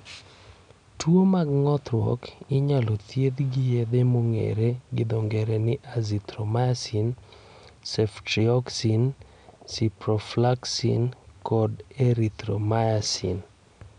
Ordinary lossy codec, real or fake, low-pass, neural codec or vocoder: none; real; 9.9 kHz; none